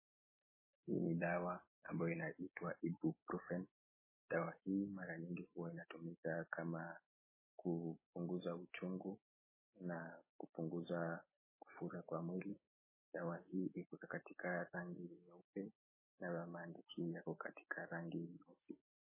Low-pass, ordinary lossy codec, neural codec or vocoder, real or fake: 3.6 kHz; MP3, 16 kbps; none; real